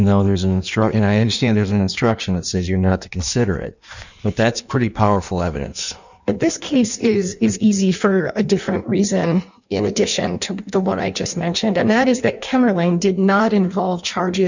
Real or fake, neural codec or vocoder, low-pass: fake; codec, 16 kHz in and 24 kHz out, 1.1 kbps, FireRedTTS-2 codec; 7.2 kHz